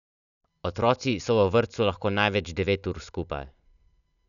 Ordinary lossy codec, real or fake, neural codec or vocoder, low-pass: none; real; none; 7.2 kHz